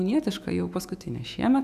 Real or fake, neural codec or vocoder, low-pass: fake; autoencoder, 48 kHz, 128 numbers a frame, DAC-VAE, trained on Japanese speech; 14.4 kHz